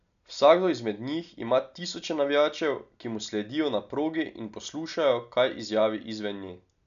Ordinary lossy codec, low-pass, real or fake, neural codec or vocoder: none; 7.2 kHz; real; none